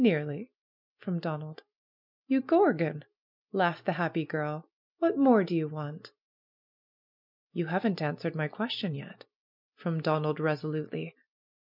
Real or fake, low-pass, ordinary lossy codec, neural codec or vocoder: real; 5.4 kHz; MP3, 48 kbps; none